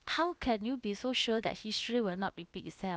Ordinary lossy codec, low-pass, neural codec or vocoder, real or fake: none; none; codec, 16 kHz, about 1 kbps, DyCAST, with the encoder's durations; fake